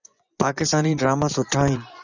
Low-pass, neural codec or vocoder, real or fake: 7.2 kHz; vocoder, 24 kHz, 100 mel bands, Vocos; fake